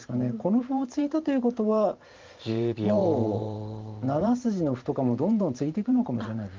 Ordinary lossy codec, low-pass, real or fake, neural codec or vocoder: Opus, 32 kbps; 7.2 kHz; fake; vocoder, 44.1 kHz, 128 mel bands every 512 samples, BigVGAN v2